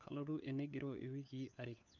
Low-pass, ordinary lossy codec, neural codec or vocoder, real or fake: 7.2 kHz; none; codec, 16 kHz, 4 kbps, FunCodec, trained on LibriTTS, 50 frames a second; fake